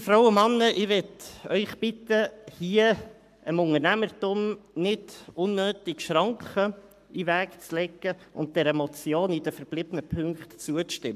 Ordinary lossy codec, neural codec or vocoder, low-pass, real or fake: MP3, 96 kbps; codec, 44.1 kHz, 7.8 kbps, Pupu-Codec; 14.4 kHz; fake